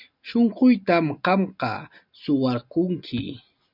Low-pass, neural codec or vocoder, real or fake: 5.4 kHz; none; real